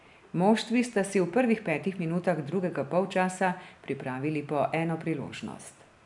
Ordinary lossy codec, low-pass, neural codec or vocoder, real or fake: none; 10.8 kHz; none; real